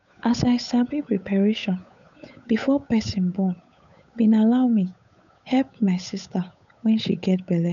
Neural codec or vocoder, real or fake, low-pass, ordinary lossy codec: codec, 16 kHz, 8 kbps, FunCodec, trained on Chinese and English, 25 frames a second; fake; 7.2 kHz; none